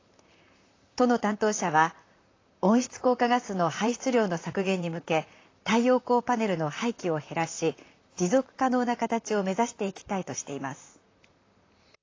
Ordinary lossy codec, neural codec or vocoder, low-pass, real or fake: AAC, 32 kbps; vocoder, 44.1 kHz, 128 mel bands, Pupu-Vocoder; 7.2 kHz; fake